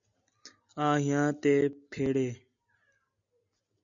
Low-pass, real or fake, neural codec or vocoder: 7.2 kHz; real; none